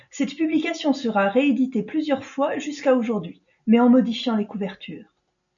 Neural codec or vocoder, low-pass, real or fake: none; 7.2 kHz; real